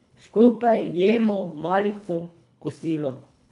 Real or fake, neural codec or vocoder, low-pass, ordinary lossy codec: fake; codec, 24 kHz, 1.5 kbps, HILCodec; 10.8 kHz; none